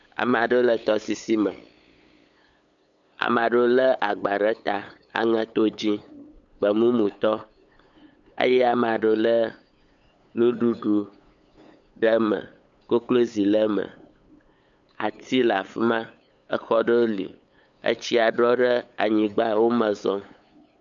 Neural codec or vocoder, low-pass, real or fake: codec, 16 kHz, 8 kbps, FunCodec, trained on LibriTTS, 25 frames a second; 7.2 kHz; fake